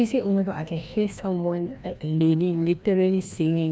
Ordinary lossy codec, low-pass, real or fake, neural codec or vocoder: none; none; fake; codec, 16 kHz, 1 kbps, FreqCodec, larger model